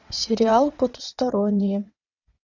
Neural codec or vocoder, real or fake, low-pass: vocoder, 22.05 kHz, 80 mel bands, WaveNeXt; fake; 7.2 kHz